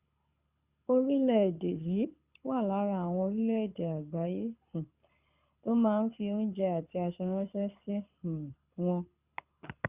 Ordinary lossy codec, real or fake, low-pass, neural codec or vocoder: Opus, 64 kbps; fake; 3.6 kHz; codec, 24 kHz, 6 kbps, HILCodec